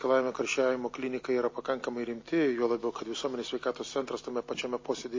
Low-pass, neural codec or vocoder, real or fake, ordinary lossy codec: 7.2 kHz; none; real; MP3, 32 kbps